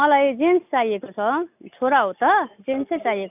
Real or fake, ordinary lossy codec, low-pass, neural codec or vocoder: real; none; 3.6 kHz; none